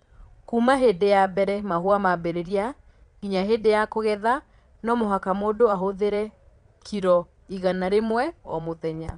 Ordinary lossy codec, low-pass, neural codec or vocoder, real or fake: none; 9.9 kHz; vocoder, 22.05 kHz, 80 mel bands, Vocos; fake